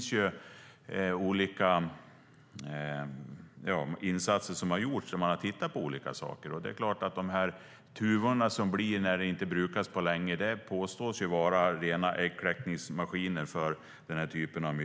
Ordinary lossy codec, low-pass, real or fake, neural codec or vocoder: none; none; real; none